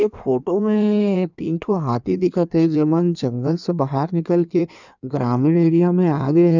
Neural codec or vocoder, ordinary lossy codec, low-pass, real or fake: codec, 16 kHz in and 24 kHz out, 1.1 kbps, FireRedTTS-2 codec; none; 7.2 kHz; fake